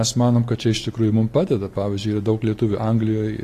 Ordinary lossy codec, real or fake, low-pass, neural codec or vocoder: AAC, 48 kbps; real; 14.4 kHz; none